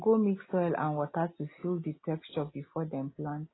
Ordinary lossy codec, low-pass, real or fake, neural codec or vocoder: AAC, 16 kbps; 7.2 kHz; real; none